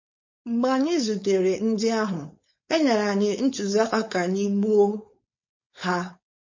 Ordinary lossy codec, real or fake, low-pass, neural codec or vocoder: MP3, 32 kbps; fake; 7.2 kHz; codec, 16 kHz, 4.8 kbps, FACodec